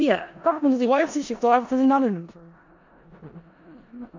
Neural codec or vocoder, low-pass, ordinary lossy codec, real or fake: codec, 16 kHz in and 24 kHz out, 0.4 kbps, LongCat-Audio-Codec, four codebook decoder; 7.2 kHz; MP3, 64 kbps; fake